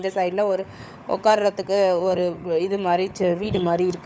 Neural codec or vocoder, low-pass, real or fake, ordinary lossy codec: codec, 16 kHz, 16 kbps, FunCodec, trained on LibriTTS, 50 frames a second; none; fake; none